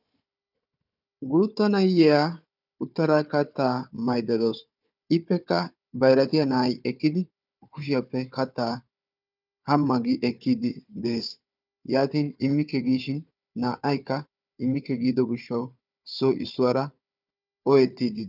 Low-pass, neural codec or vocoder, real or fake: 5.4 kHz; codec, 16 kHz, 4 kbps, FunCodec, trained on Chinese and English, 50 frames a second; fake